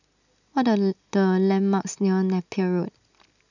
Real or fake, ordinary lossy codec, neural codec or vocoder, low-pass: real; none; none; 7.2 kHz